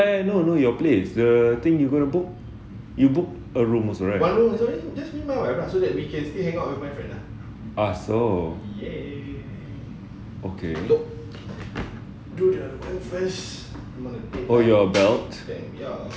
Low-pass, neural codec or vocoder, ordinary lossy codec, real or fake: none; none; none; real